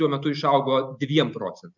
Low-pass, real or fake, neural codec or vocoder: 7.2 kHz; real; none